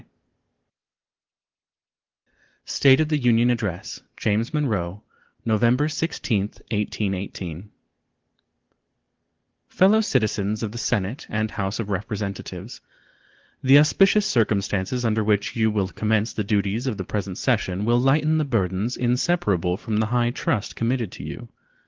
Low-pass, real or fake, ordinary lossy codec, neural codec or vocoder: 7.2 kHz; real; Opus, 16 kbps; none